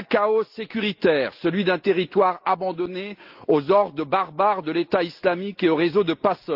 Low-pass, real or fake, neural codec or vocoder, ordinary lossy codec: 5.4 kHz; real; none; Opus, 32 kbps